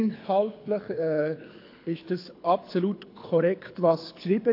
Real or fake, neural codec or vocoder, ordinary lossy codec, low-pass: fake; codec, 24 kHz, 6 kbps, HILCodec; AAC, 32 kbps; 5.4 kHz